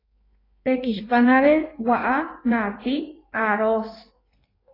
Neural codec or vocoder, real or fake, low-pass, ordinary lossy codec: codec, 16 kHz in and 24 kHz out, 1.1 kbps, FireRedTTS-2 codec; fake; 5.4 kHz; AAC, 24 kbps